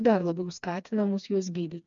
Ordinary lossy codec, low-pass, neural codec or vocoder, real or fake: MP3, 64 kbps; 7.2 kHz; codec, 16 kHz, 2 kbps, FreqCodec, smaller model; fake